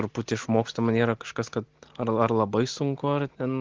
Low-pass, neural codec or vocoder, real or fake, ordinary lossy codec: 7.2 kHz; none; real; Opus, 16 kbps